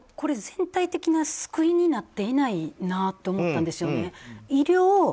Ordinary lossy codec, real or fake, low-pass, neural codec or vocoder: none; real; none; none